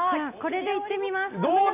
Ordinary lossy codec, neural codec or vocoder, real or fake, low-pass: none; none; real; 3.6 kHz